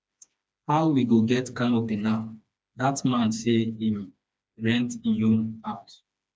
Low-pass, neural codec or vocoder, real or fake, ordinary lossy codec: none; codec, 16 kHz, 2 kbps, FreqCodec, smaller model; fake; none